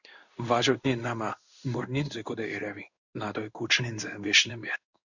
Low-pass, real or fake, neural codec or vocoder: 7.2 kHz; fake; codec, 16 kHz in and 24 kHz out, 1 kbps, XY-Tokenizer